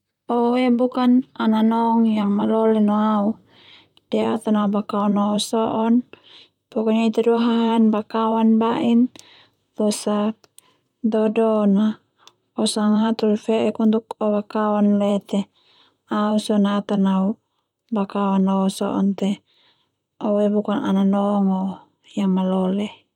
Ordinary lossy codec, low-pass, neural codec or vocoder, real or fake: none; 19.8 kHz; vocoder, 44.1 kHz, 128 mel bands, Pupu-Vocoder; fake